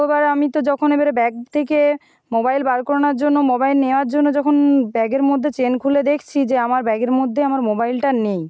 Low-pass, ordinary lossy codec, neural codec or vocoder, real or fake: none; none; none; real